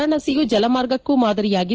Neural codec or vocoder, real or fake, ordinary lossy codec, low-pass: none; real; Opus, 16 kbps; 7.2 kHz